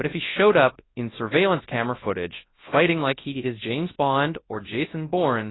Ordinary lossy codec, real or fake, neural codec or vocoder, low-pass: AAC, 16 kbps; fake; codec, 24 kHz, 0.9 kbps, WavTokenizer, large speech release; 7.2 kHz